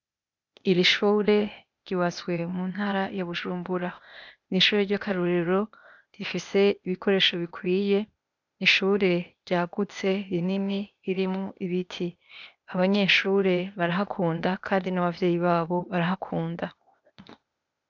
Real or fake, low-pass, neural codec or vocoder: fake; 7.2 kHz; codec, 16 kHz, 0.8 kbps, ZipCodec